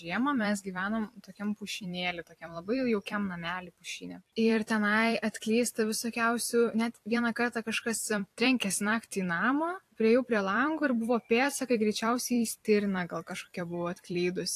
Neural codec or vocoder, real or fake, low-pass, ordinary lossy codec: vocoder, 44.1 kHz, 128 mel bands every 256 samples, BigVGAN v2; fake; 14.4 kHz; AAC, 64 kbps